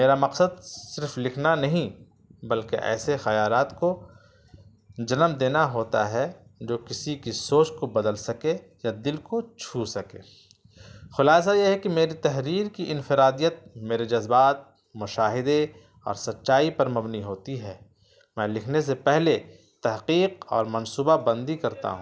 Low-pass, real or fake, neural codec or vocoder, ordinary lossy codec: none; real; none; none